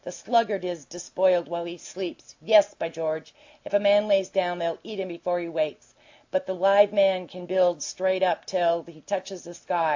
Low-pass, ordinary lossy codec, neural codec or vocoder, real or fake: 7.2 kHz; AAC, 48 kbps; none; real